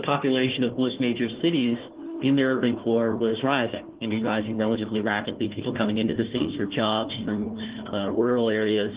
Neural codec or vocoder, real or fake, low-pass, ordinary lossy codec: codec, 16 kHz, 1 kbps, FunCodec, trained on Chinese and English, 50 frames a second; fake; 3.6 kHz; Opus, 16 kbps